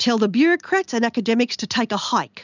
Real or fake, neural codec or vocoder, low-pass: real; none; 7.2 kHz